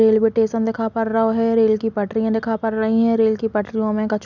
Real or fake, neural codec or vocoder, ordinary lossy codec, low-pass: real; none; none; 7.2 kHz